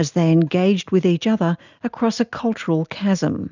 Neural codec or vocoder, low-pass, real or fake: none; 7.2 kHz; real